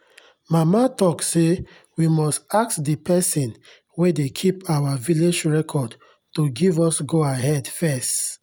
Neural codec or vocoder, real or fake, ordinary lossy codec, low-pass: none; real; none; none